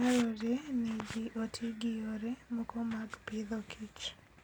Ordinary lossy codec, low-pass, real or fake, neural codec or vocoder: none; 19.8 kHz; real; none